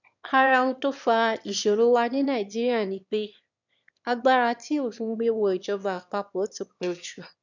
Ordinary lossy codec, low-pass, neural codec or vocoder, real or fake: none; 7.2 kHz; autoencoder, 22.05 kHz, a latent of 192 numbers a frame, VITS, trained on one speaker; fake